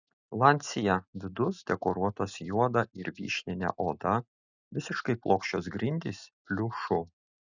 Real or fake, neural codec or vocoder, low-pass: real; none; 7.2 kHz